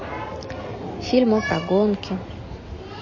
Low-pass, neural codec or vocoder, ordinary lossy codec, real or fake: 7.2 kHz; none; MP3, 32 kbps; real